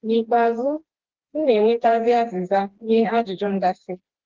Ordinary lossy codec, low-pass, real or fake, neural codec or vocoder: Opus, 16 kbps; 7.2 kHz; fake; codec, 16 kHz, 2 kbps, FreqCodec, smaller model